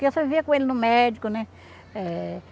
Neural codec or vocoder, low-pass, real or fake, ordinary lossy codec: none; none; real; none